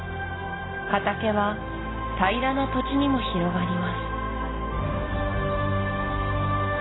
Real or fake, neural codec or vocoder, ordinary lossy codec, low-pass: real; none; AAC, 16 kbps; 7.2 kHz